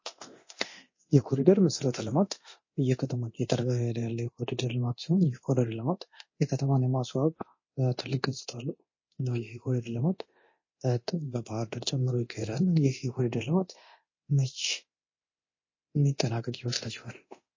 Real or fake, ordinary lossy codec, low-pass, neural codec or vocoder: fake; MP3, 32 kbps; 7.2 kHz; codec, 24 kHz, 0.9 kbps, DualCodec